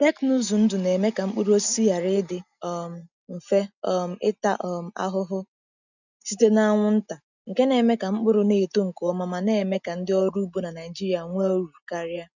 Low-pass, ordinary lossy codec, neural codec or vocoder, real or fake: 7.2 kHz; none; none; real